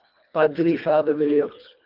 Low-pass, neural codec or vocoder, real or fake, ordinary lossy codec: 5.4 kHz; codec, 24 kHz, 1.5 kbps, HILCodec; fake; Opus, 24 kbps